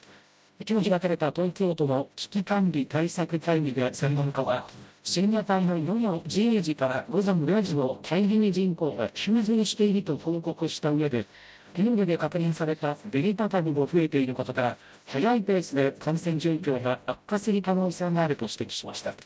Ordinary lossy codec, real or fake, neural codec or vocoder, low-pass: none; fake; codec, 16 kHz, 0.5 kbps, FreqCodec, smaller model; none